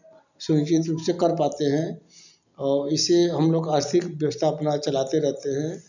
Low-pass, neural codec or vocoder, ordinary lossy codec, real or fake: 7.2 kHz; none; none; real